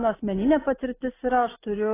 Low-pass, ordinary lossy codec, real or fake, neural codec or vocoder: 3.6 kHz; AAC, 16 kbps; real; none